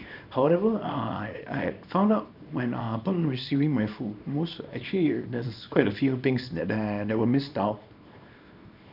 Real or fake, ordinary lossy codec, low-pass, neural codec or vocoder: fake; none; 5.4 kHz; codec, 24 kHz, 0.9 kbps, WavTokenizer, small release